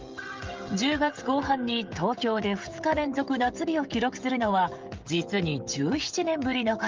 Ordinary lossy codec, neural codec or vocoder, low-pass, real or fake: Opus, 24 kbps; codec, 16 kHz, 16 kbps, FreqCodec, smaller model; 7.2 kHz; fake